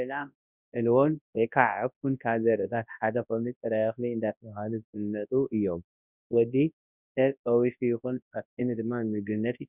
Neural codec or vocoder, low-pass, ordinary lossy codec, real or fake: codec, 24 kHz, 0.9 kbps, WavTokenizer, large speech release; 3.6 kHz; Opus, 64 kbps; fake